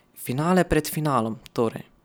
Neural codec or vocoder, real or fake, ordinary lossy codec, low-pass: none; real; none; none